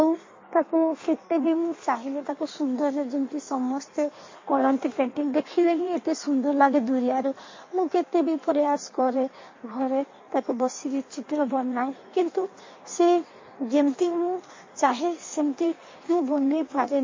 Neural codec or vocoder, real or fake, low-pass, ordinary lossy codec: codec, 16 kHz in and 24 kHz out, 1.1 kbps, FireRedTTS-2 codec; fake; 7.2 kHz; MP3, 32 kbps